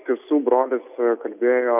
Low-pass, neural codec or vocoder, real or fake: 3.6 kHz; none; real